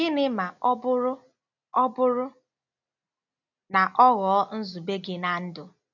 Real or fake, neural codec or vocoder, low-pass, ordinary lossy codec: real; none; 7.2 kHz; none